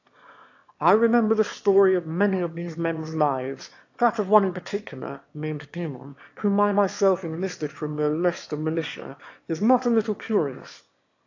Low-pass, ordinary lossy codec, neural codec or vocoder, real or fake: 7.2 kHz; AAC, 48 kbps; autoencoder, 22.05 kHz, a latent of 192 numbers a frame, VITS, trained on one speaker; fake